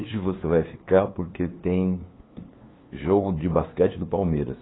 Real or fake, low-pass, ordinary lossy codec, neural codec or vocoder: fake; 7.2 kHz; AAC, 16 kbps; codec, 16 kHz, 2 kbps, FunCodec, trained on LibriTTS, 25 frames a second